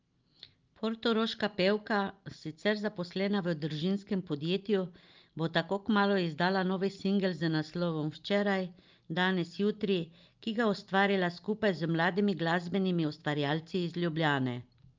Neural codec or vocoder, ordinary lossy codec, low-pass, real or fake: none; Opus, 24 kbps; 7.2 kHz; real